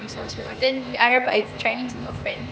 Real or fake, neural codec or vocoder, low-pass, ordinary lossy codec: fake; codec, 16 kHz, 0.8 kbps, ZipCodec; none; none